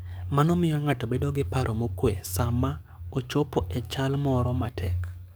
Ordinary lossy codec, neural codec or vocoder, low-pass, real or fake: none; codec, 44.1 kHz, 7.8 kbps, DAC; none; fake